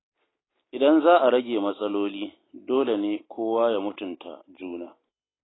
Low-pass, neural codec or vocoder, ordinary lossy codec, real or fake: 7.2 kHz; none; AAC, 16 kbps; real